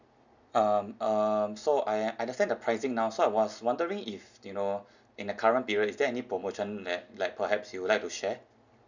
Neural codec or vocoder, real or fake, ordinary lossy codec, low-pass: none; real; none; 7.2 kHz